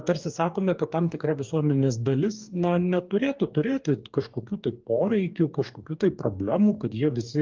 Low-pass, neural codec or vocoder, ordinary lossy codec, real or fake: 7.2 kHz; codec, 44.1 kHz, 2.6 kbps, DAC; Opus, 24 kbps; fake